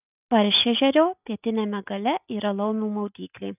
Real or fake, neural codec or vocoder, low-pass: real; none; 3.6 kHz